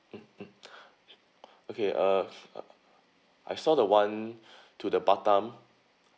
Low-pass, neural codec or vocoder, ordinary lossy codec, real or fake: none; none; none; real